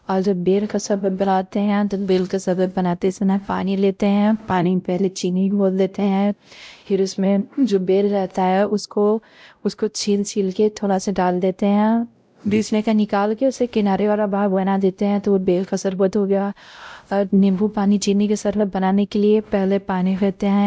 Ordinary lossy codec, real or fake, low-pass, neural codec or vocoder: none; fake; none; codec, 16 kHz, 0.5 kbps, X-Codec, WavLM features, trained on Multilingual LibriSpeech